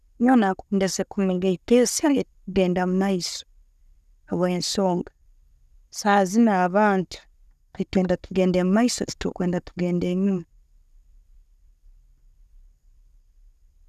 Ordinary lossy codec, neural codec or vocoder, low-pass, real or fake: none; vocoder, 44.1 kHz, 128 mel bands every 256 samples, BigVGAN v2; 14.4 kHz; fake